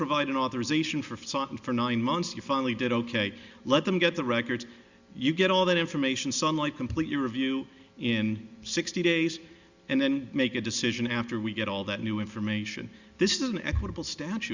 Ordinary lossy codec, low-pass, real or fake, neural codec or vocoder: Opus, 64 kbps; 7.2 kHz; real; none